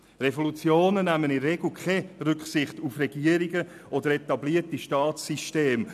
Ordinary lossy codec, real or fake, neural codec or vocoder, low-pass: none; real; none; 14.4 kHz